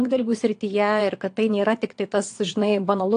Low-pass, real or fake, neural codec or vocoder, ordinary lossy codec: 9.9 kHz; fake; vocoder, 22.05 kHz, 80 mel bands, Vocos; AAC, 48 kbps